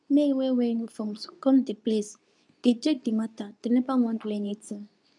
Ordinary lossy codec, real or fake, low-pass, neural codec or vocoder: none; fake; 10.8 kHz; codec, 24 kHz, 0.9 kbps, WavTokenizer, medium speech release version 2